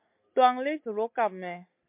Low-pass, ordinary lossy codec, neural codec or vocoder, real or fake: 3.6 kHz; MP3, 32 kbps; none; real